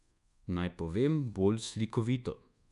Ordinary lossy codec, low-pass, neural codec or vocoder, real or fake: none; 10.8 kHz; codec, 24 kHz, 1.2 kbps, DualCodec; fake